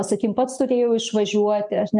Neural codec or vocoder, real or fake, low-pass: none; real; 10.8 kHz